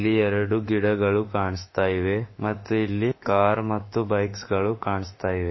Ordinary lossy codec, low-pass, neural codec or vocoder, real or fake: MP3, 24 kbps; 7.2 kHz; autoencoder, 48 kHz, 32 numbers a frame, DAC-VAE, trained on Japanese speech; fake